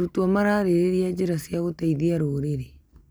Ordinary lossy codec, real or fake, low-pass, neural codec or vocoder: none; real; none; none